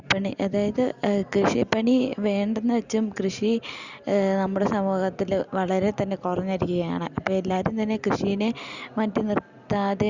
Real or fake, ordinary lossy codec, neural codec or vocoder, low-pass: real; Opus, 64 kbps; none; 7.2 kHz